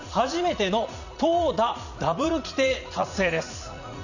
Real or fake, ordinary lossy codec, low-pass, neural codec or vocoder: fake; none; 7.2 kHz; vocoder, 44.1 kHz, 80 mel bands, Vocos